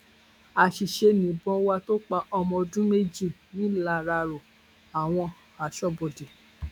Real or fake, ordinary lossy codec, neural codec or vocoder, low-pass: fake; none; autoencoder, 48 kHz, 128 numbers a frame, DAC-VAE, trained on Japanese speech; 19.8 kHz